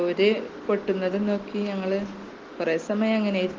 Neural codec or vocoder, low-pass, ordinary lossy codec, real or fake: none; 7.2 kHz; Opus, 16 kbps; real